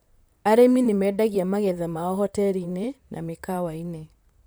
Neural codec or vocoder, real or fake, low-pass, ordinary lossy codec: vocoder, 44.1 kHz, 128 mel bands, Pupu-Vocoder; fake; none; none